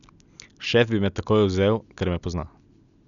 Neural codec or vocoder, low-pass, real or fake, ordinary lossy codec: none; 7.2 kHz; real; none